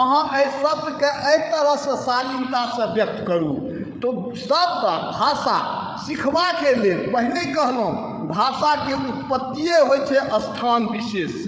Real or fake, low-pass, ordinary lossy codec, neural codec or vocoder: fake; none; none; codec, 16 kHz, 8 kbps, FreqCodec, larger model